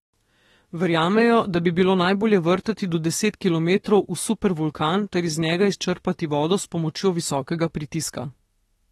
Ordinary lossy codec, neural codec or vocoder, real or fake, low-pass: AAC, 32 kbps; autoencoder, 48 kHz, 32 numbers a frame, DAC-VAE, trained on Japanese speech; fake; 19.8 kHz